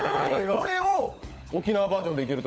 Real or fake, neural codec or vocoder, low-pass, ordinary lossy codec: fake; codec, 16 kHz, 16 kbps, FunCodec, trained on LibriTTS, 50 frames a second; none; none